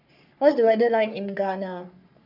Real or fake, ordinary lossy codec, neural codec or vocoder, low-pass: fake; none; codec, 44.1 kHz, 3.4 kbps, Pupu-Codec; 5.4 kHz